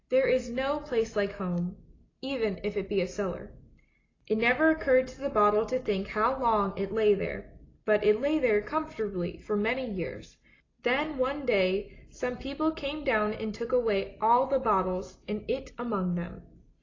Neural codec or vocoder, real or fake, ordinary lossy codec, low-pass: none; real; AAC, 32 kbps; 7.2 kHz